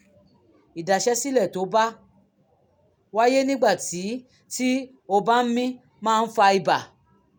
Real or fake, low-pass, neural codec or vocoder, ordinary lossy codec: real; none; none; none